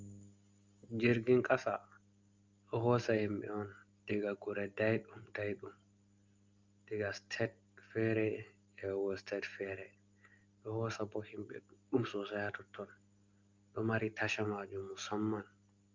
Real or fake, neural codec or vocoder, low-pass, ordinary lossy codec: real; none; 7.2 kHz; Opus, 32 kbps